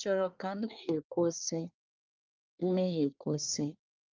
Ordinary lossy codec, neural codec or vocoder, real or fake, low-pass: Opus, 24 kbps; codec, 24 kHz, 1 kbps, SNAC; fake; 7.2 kHz